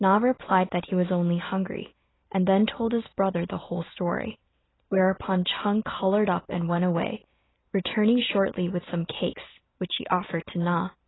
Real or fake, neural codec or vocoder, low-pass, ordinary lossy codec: real; none; 7.2 kHz; AAC, 16 kbps